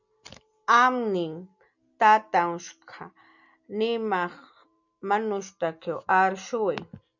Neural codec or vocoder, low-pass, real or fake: none; 7.2 kHz; real